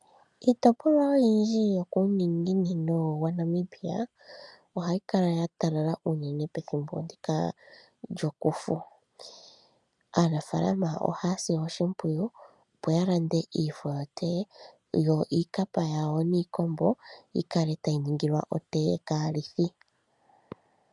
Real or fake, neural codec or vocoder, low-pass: real; none; 10.8 kHz